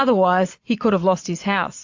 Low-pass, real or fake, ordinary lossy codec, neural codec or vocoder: 7.2 kHz; fake; AAC, 48 kbps; vocoder, 22.05 kHz, 80 mel bands, Vocos